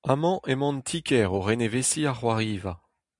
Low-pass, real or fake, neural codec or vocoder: 10.8 kHz; real; none